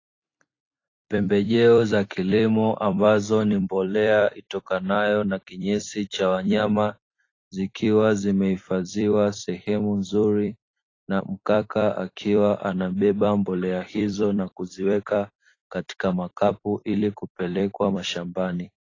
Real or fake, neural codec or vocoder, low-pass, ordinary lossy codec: fake; vocoder, 44.1 kHz, 128 mel bands every 256 samples, BigVGAN v2; 7.2 kHz; AAC, 32 kbps